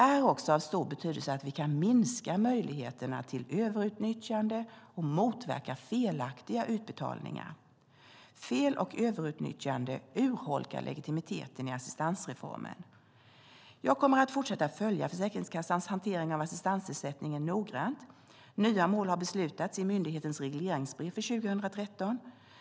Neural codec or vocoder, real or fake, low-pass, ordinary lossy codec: none; real; none; none